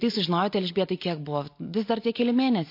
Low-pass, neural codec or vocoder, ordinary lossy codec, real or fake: 5.4 kHz; none; MP3, 32 kbps; real